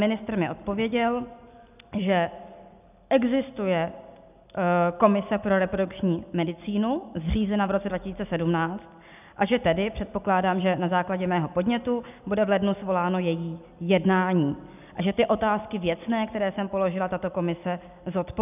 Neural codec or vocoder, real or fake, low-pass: none; real; 3.6 kHz